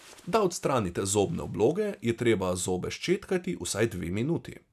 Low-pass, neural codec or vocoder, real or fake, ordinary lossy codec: 14.4 kHz; none; real; none